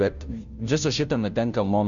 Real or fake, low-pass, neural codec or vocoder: fake; 7.2 kHz; codec, 16 kHz, 0.5 kbps, FunCodec, trained on Chinese and English, 25 frames a second